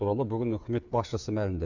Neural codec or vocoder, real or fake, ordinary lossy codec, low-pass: codec, 16 kHz, 8 kbps, FreqCodec, smaller model; fake; none; 7.2 kHz